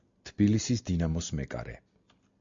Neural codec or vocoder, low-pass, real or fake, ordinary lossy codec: none; 7.2 kHz; real; MP3, 64 kbps